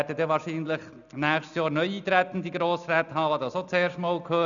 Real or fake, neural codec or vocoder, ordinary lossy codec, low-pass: real; none; none; 7.2 kHz